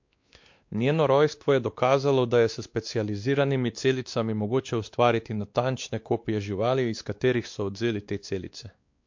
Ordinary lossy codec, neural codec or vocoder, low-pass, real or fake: MP3, 48 kbps; codec, 16 kHz, 2 kbps, X-Codec, WavLM features, trained on Multilingual LibriSpeech; 7.2 kHz; fake